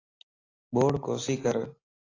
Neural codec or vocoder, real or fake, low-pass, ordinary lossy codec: none; real; 7.2 kHz; AAC, 32 kbps